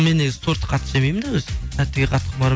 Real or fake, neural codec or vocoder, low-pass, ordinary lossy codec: real; none; none; none